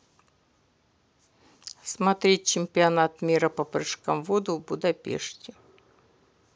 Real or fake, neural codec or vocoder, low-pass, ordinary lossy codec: real; none; none; none